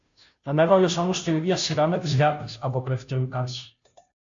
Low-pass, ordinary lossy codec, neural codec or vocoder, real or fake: 7.2 kHz; AAC, 64 kbps; codec, 16 kHz, 0.5 kbps, FunCodec, trained on Chinese and English, 25 frames a second; fake